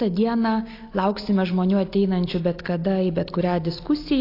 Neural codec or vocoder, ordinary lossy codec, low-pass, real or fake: none; AAC, 32 kbps; 5.4 kHz; real